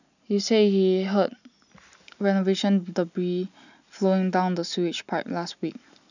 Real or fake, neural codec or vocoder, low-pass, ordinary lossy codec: real; none; 7.2 kHz; none